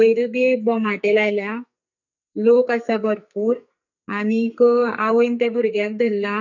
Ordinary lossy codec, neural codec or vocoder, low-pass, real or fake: none; codec, 44.1 kHz, 2.6 kbps, SNAC; 7.2 kHz; fake